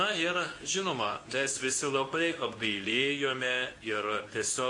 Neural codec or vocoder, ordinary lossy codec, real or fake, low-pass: codec, 24 kHz, 0.9 kbps, WavTokenizer, medium speech release version 2; AAC, 48 kbps; fake; 10.8 kHz